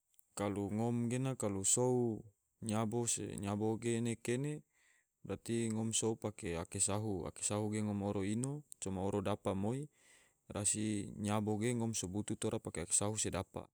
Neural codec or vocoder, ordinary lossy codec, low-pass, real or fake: none; none; none; real